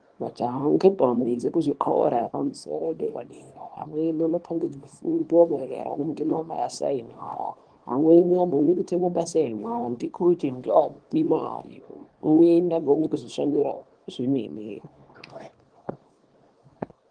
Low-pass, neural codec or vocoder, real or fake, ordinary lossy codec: 9.9 kHz; codec, 24 kHz, 0.9 kbps, WavTokenizer, small release; fake; Opus, 24 kbps